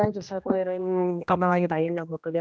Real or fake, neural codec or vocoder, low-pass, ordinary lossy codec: fake; codec, 16 kHz, 1 kbps, X-Codec, HuBERT features, trained on general audio; none; none